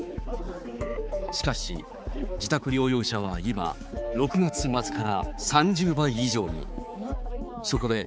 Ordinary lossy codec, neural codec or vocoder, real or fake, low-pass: none; codec, 16 kHz, 4 kbps, X-Codec, HuBERT features, trained on balanced general audio; fake; none